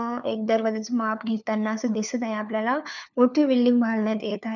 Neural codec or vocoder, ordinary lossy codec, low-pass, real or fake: codec, 16 kHz, 4 kbps, FunCodec, trained on LibriTTS, 50 frames a second; none; 7.2 kHz; fake